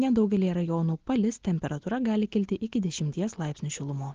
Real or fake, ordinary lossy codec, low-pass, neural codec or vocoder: real; Opus, 16 kbps; 7.2 kHz; none